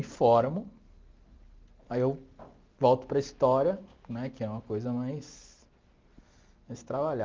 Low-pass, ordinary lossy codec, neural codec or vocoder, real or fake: 7.2 kHz; Opus, 32 kbps; none; real